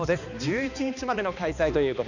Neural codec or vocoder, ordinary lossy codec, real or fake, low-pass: codec, 16 kHz, 2 kbps, X-Codec, HuBERT features, trained on balanced general audio; none; fake; 7.2 kHz